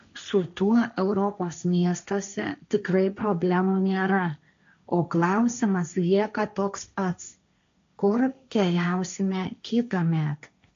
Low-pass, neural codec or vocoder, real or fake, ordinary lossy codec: 7.2 kHz; codec, 16 kHz, 1.1 kbps, Voila-Tokenizer; fake; AAC, 64 kbps